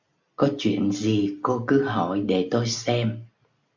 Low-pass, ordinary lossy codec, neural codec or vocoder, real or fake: 7.2 kHz; MP3, 64 kbps; none; real